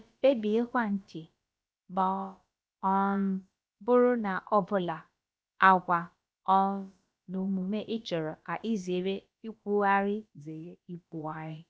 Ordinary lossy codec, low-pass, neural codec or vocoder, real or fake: none; none; codec, 16 kHz, about 1 kbps, DyCAST, with the encoder's durations; fake